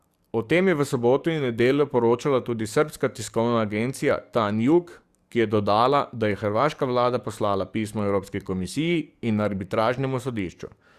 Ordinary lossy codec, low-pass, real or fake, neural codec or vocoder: Opus, 64 kbps; 14.4 kHz; fake; codec, 44.1 kHz, 7.8 kbps, DAC